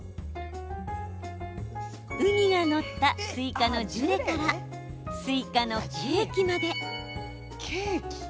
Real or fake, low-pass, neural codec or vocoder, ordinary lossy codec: real; none; none; none